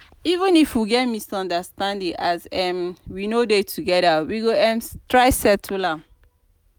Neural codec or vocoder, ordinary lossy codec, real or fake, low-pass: none; none; real; none